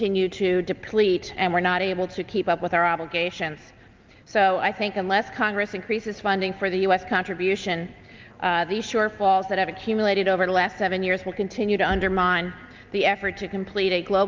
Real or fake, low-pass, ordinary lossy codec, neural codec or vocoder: real; 7.2 kHz; Opus, 32 kbps; none